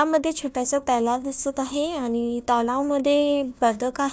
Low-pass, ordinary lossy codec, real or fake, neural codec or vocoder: none; none; fake; codec, 16 kHz, 1 kbps, FunCodec, trained on Chinese and English, 50 frames a second